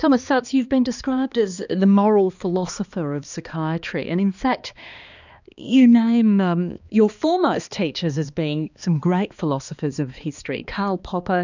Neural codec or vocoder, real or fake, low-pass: codec, 16 kHz, 2 kbps, X-Codec, HuBERT features, trained on balanced general audio; fake; 7.2 kHz